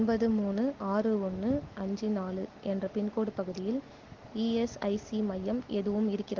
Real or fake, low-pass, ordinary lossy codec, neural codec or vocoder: real; 7.2 kHz; Opus, 16 kbps; none